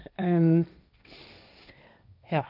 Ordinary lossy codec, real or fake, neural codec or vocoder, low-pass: none; fake; codec, 16 kHz, 1.1 kbps, Voila-Tokenizer; 5.4 kHz